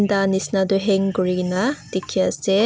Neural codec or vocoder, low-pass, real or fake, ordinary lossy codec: none; none; real; none